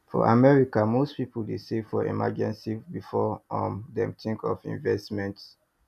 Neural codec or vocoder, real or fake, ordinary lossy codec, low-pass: none; real; none; 14.4 kHz